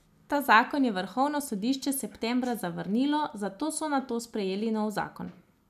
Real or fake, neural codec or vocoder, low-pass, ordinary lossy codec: real; none; 14.4 kHz; none